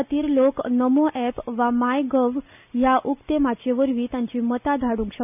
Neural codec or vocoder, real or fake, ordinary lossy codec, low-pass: none; real; none; 3.6 kHz